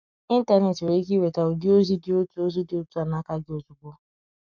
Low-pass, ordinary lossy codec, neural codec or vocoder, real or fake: 7.2 kHz; none; codec, 44.1 kHz, 7.8 kbps, Pupu-Codec; fake